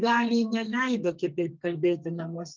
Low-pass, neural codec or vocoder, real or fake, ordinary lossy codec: 7.2 kHz; codec, 32 kHz, 1.9 kbps, SNAC; fake; Opus, 24 kbps